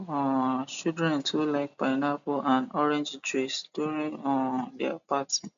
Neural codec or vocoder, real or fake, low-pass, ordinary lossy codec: none; real; 7.2 kHz; MP3, 64 kbps